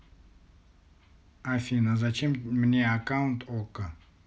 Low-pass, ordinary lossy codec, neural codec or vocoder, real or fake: none; none; none; real